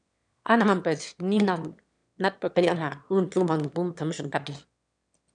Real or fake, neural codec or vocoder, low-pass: fake; autoencoder, 22.05 kHz, a latent of 192 numbers a frame, VITS, trained on one speaker; 9.9 kHz